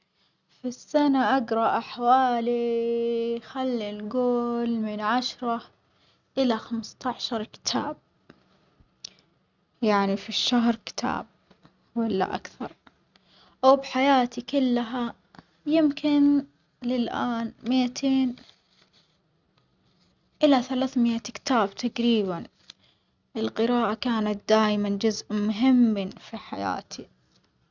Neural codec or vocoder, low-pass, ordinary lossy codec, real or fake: none; 7.2 kHz; none; real